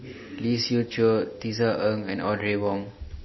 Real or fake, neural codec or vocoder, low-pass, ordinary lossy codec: real; none; 7.2 kHz; MP3, 24 kbps